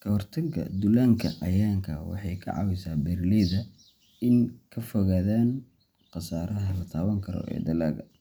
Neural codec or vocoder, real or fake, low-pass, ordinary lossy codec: none; real; none; none